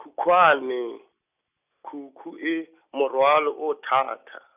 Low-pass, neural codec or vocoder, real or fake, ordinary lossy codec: 3.6 kHz; none; real; none